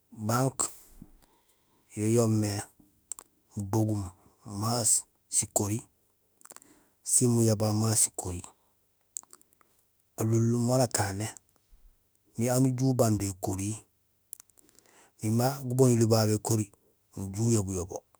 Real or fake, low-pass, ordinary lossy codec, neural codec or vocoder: fake; none; none; autoencoder, 48 kHz, 32 numbers a frame, DAC-VAE, trained on Japanese speech